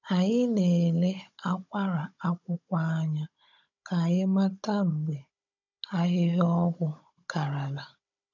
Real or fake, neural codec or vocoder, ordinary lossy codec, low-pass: fake; codec, 16 kHz, 16 kbps, FunCodec, trained on Chinese and English, 50 frames a second; none; 7.2 kHz